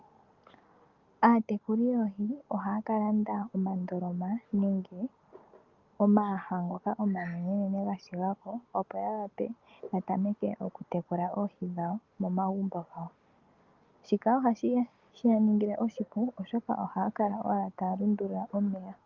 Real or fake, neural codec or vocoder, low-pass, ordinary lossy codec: real; none; 7.2 kHz; Opus, 32 kbps